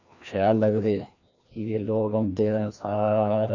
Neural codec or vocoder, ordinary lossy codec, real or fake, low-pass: codec, 16 kHz, 1 kbps, FreqCodec, larger model; none; fake; 7.2 kHz